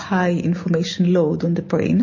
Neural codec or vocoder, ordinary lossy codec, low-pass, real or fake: none; MP3, 32 kbps; 7.2 kHz; real